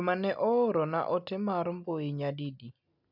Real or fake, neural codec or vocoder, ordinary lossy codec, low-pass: real; none; none; 5.4 kHz